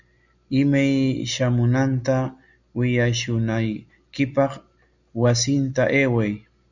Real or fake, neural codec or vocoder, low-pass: real; none; 7.2 kHz